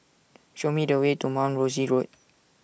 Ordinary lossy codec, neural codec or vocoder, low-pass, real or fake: none; none; none; real